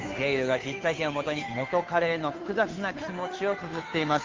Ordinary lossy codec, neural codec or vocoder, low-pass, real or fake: Opus, 32 kbps; codec, 16 kHz, 2 kbps, FunCodec, trained on Chinese and English, 25 frames a second; 7.2 kHz; fake